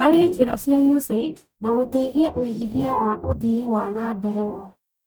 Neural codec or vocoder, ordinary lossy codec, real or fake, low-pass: codec, 44.1 kHz, 0.9 kbps, DAC; none; fake; none